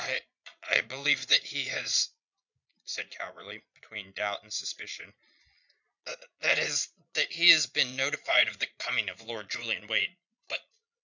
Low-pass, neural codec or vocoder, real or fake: 7.2 kHz; vocoder, 22.05 kHz, 80 mel bands, Vocos; fake